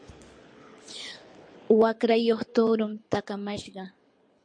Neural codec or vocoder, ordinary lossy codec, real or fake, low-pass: codec, 24 kHz, 6 kbps, HILCodec; MP3, 48 kbps; fake; 9.9 kHz